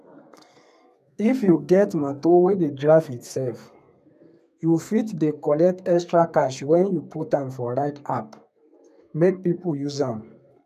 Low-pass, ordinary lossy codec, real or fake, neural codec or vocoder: 14.4 kHz; none; fake; codec, 32 kHz, 1.9 kbps, SNAC